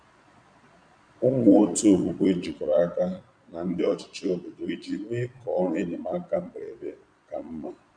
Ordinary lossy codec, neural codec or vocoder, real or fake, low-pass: none; vocoder, 22.05 kHz, 80 mel bands, Vocos; fake; 9.9 kHz